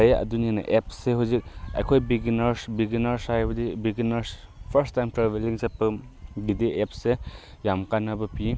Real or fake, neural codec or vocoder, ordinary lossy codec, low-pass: real; none; none; none